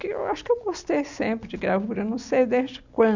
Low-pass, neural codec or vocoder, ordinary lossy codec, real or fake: 7.2 kHz; none; none; real